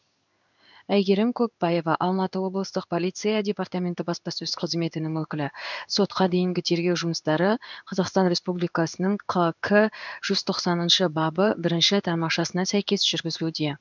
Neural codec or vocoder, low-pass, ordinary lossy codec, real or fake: codec, 16 kHz in and 24 kHz out, 1 kbps, XY-Tokenizer; 7.2 kHz; none; fake